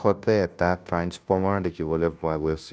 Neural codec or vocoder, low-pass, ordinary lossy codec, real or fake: codec, 16 kHz, 0.5 kbps, FunCodec, trained on Chinese and English, 25 frames a second; none; none; fake